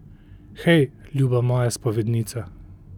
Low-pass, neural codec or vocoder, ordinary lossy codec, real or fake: 19.8 kHz; none; none; real